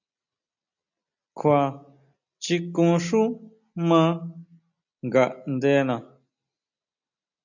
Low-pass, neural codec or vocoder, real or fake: 7.2 kHz; none; real